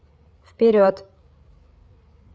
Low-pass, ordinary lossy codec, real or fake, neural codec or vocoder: none; none; fake; codec, 16 kHz, 8 kbps, FreqCodec, larger model